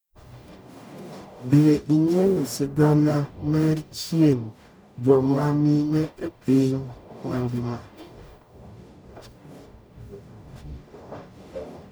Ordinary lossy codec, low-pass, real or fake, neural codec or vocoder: none; none; fake; codec, 44.1 kHz, 0.9 kbps, DAC